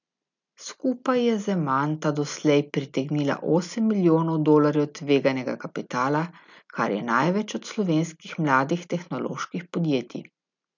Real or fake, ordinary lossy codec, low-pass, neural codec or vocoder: real; none; 7.2 kHz; none